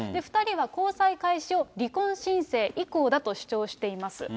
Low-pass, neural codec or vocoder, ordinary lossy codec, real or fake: none; none; none; real